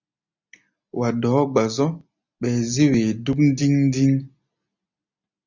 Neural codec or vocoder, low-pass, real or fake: none; 7.2 kHz; real